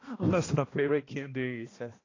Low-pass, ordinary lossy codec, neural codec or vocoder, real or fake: 7.2 kHz; AAC, 32 kbps; codec, 16 kHz, 1 kbps, X-Codec, HuBERT features, trained on balanced general audio; fake